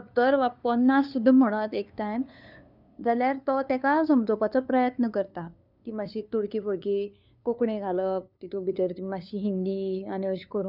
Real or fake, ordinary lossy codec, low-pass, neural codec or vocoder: fake; none; 5.4 kHz; codec, 16 kHz, 2 kbps, FunCodec, trained on LibriTTS, 25 frames a second